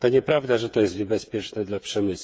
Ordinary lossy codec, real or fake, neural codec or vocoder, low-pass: none; fake; codec, 16 kHz, 8 kbps, FreqCodec, smaller model; none